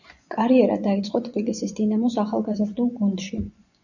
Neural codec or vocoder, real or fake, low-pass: vocoder, 24 kHz, 100 mel bands, Vocos; fake; 7.2 kHz